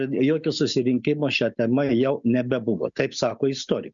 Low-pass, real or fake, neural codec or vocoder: 7.2 kHz; real; none